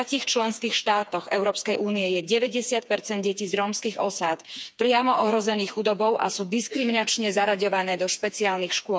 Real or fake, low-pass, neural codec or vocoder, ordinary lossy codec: fake; none; codec, 16 kHz, 4 kbps, FreqCodec, smaller model; none